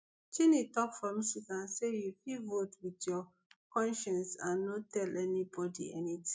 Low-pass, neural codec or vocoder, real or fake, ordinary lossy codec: none; none; real; none